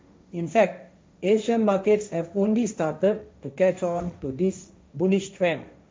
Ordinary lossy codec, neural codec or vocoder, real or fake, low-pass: none; codec, 16 kHz, 1.1 kbps, Voila-Tokenizer; fake; none